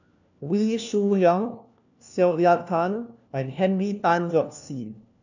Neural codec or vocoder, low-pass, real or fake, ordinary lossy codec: codec, 16 kHz, 1 kbps, FunCodec, trained on LibriTTS, 50 frames a second; 7.2 kHz; fake; none